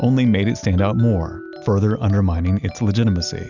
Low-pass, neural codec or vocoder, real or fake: 7.2 kHz; none; real